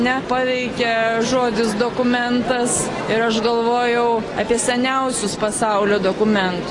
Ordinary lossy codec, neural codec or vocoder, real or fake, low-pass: AAC, 32 kbps; none; real; 10.8 kHz